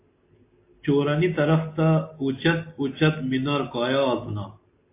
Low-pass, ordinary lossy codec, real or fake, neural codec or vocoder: 3.6 kHz; MP3, 24 kbps; real; none